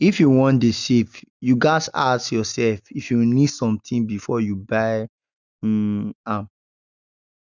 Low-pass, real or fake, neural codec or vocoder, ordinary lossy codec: 7.2 kHz; real; none; none